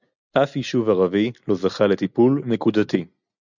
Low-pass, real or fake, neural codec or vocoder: 7.2 kHz; real; none